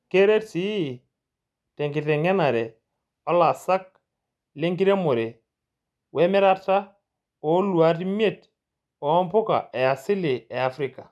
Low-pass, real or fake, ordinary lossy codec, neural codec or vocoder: none; real; none; none